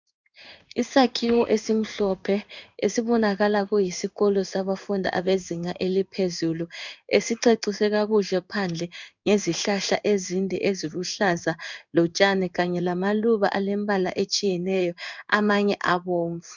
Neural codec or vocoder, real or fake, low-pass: codec, 16 kHz in and 24 kHz out, 1 kbps, XY-Tokenizer; fake; 7.2 kHz